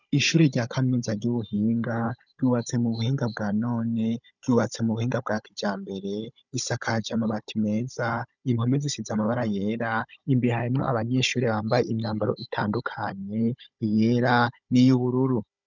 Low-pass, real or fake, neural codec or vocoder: 7.2 kHz; fake; codec, 16 kHz, 16 kbps, FunCodec, trained on Chinese and English, 50 frames a second